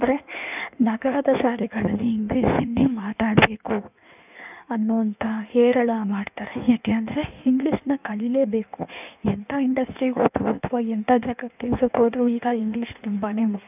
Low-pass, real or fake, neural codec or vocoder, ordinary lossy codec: 3.6 kHz; fake; codec, 16 kHz in and 24 kHz out, 1.1 kbps, FireRedTTS-2 codec; none